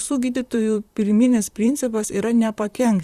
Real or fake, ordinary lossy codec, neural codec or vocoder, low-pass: fake; Opus, 64 kbps; vocoder, 44.1 kHz, 128 mel bands, Pupu-Vocoder; 14.4 kHz